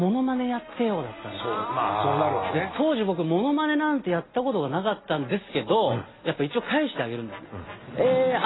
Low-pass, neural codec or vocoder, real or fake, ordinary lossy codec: 7.2 kHz; none; real; AAC, 16 kbps